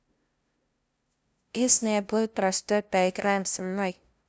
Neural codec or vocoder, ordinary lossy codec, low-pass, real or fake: codec, 16 kHz, 0.5 kbps, FunCodec, trained on LibriTTS, 25 frames a second; none; none; fake